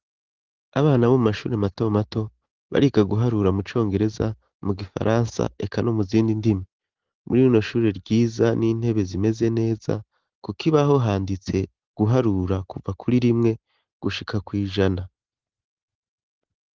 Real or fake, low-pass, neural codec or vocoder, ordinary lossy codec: real; 7.2 kHz; none; Opus, 16 kbps